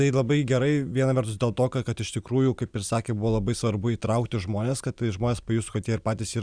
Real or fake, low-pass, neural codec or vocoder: real; 9.9 kHz; none